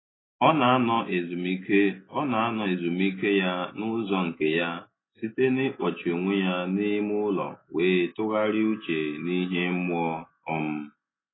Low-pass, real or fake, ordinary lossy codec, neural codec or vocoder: 7.2 kHz; real; AAC, 16 kbps; none